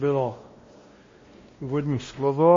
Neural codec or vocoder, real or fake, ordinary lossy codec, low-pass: codec, 16 kHz, 1 kbps, X-Codec, WavLM features, trained on Multilingual LibriSpeech; fake; MP3, 32 kbps; 7.2 kHz